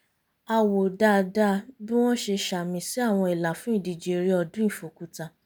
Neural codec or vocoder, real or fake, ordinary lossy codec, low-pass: none; real; none; none